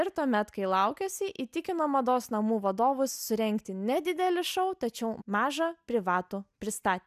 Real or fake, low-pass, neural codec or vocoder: real; 14.4 kHz; none